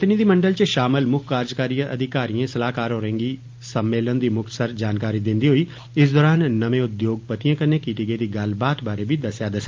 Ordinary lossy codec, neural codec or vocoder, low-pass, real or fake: Opus, 32 kbps; none; 7.2 kHz; real